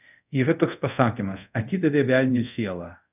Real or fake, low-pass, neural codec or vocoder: fake; 3.6 kHz; codec, 24 kHz, 0.5 kbps, DualCodec